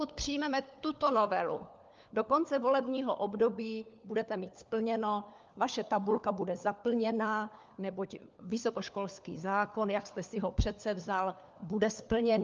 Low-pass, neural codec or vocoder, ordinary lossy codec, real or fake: 7.2 kHz; codec, 16 kHz, 4 kbps, FunCodec, trained on LibriTTS, 50 frames a second; Opus, 24 kbps; fake